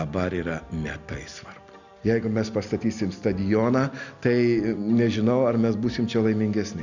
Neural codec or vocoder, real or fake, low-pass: none; real; 7.2 kHz